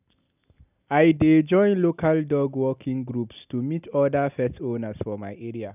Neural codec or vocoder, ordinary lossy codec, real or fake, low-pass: none; none; real; 3.6 kHz